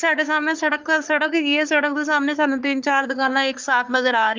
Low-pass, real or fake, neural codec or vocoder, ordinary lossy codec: 7.2 kHz; fake; codec, 44.1 kHz, 3.4 kbps, Pupu-Codec; Opus, 24 kbps